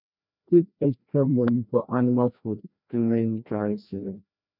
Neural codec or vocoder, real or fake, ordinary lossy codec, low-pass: codec, 16 kHz, 1 kbps, FreqCodec, larger model; fake; none; 5.4 kHz